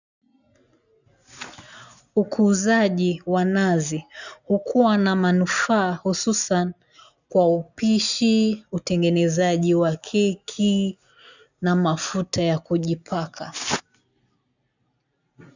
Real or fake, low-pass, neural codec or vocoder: real; 7.2 kHz; none